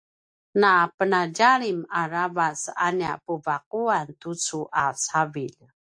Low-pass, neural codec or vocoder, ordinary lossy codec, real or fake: 9.9 kHz; none; AAC, 64 kbps; real